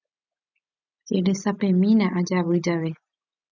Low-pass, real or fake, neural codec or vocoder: 7.2 kHz; real; none